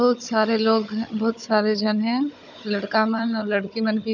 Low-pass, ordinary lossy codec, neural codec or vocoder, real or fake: 7.2 kHz; none; codec, 16 kHz, 16 kbps, FunCodec, trained on Chinese and English, 50 frames a second; fake